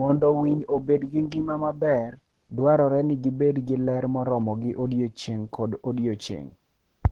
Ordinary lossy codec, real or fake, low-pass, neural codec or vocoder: Opus, 16 kbps; fake; 19.8 kHz; codec, 44.1 kHz, 7.8 kbps, Pupu-Codec